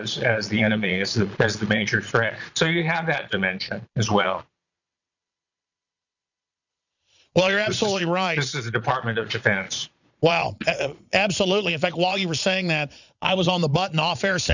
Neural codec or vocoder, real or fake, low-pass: vocoder, 22.05 kHz, 80 mel bands, Vocos; fake; 7.2 kHz